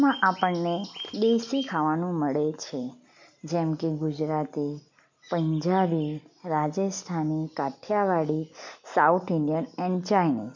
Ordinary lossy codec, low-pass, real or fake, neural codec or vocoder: MP3, 64 kbps; 7.2 kHz; real; none